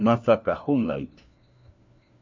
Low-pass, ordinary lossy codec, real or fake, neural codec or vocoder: 7.2 kHz; MP3, 64 kbps; fake; codec, 16 kHz, 1 kbps, FunCodec, trained on LibriTTS, 50 frames a second